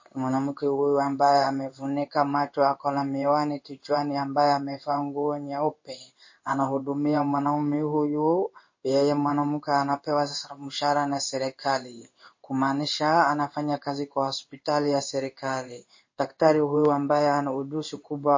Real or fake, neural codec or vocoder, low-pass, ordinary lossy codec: fake; codec, 16 kHz in and 24 kHz out, 1 kbps, XY-Tokenizer; 7.2 kHz; MP3, 32 kbps